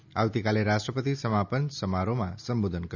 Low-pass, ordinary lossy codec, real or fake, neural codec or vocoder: 7.2 kHz; none; real; none